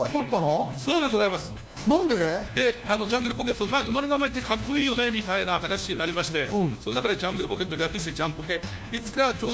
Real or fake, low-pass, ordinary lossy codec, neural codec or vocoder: fake; none; none; codec, 16 kHz, 1 kbps, FunCodec, trained on LibriTTS, 50 frames a second